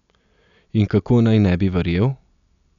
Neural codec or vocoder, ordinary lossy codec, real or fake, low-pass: none; none; real; 7.2 kHz